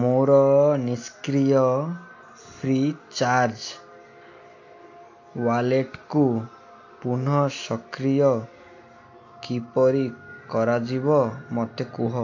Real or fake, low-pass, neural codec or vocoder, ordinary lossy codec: real; 7.2 kHz; none; AAC, 48 kbps